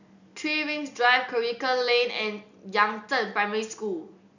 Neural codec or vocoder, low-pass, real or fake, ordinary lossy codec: none; 7.2 kHz; real; none